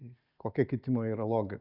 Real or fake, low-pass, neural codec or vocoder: real; 5.4 kHz; none